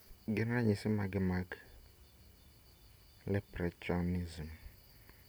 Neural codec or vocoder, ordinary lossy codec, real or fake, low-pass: none; none; real; none